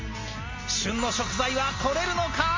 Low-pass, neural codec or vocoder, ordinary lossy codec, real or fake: 7.2 kHz; none; MP3, 32 kbps; real